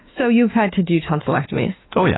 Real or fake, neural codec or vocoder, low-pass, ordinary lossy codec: fake; autoencoder, 48 kHz, 32 numbers a frame, DAC-VAE, trained on Japanese speech; 7.2 kHz; AAC, 16 kbps